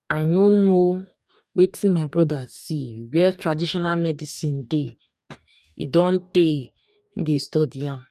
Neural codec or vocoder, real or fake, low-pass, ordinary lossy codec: codec, 44.1 kHz, 2.6 kbps, DAC; fake; 14.4 kHz; none